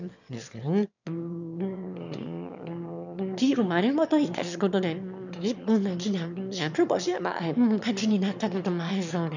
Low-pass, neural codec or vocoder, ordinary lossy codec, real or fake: 7.2 kHz; autoencoder, 22.05 kHz, a latent of 192 numbers a frame, VITS, trained on one speaker; none; fake